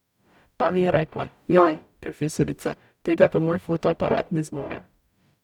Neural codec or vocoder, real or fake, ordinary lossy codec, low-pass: codec, 44.1 kHz, 0.9 kbps, DAC; fake; none; 19.8 kHz